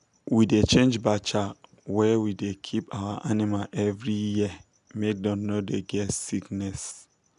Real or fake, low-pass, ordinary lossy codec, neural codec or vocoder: real; 10.8 kHz; none; none